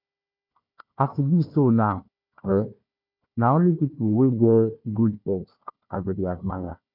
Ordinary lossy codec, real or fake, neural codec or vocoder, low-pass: none; fake; codec, 16 kHz, 1 kbps, FunCodec, trained on Chinese and English, 50 frames a second; 5.4 kHz